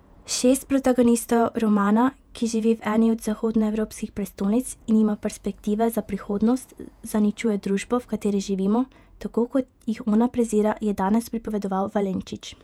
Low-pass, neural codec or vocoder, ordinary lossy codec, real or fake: 19.8 kHz; vocoder, 48 kHz, 128 mel bands, Vocos; none; fake